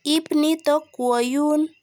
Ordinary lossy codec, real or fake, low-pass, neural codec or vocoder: none; real; none; none